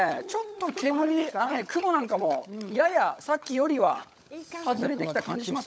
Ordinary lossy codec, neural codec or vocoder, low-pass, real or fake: none; codec, 16 kHz, 16 kbps, FunCodec, trained on LibriTTS, 50 frames a second; none; fake